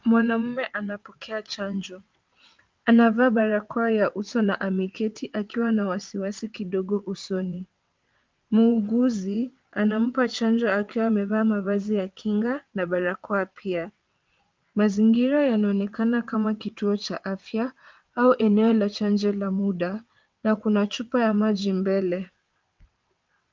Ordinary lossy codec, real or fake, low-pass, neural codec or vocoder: Opus, 24 kbps; fake; 7.2 kHz; vocoder, 22.05 kHz, 80 mel bands, WaveNeXt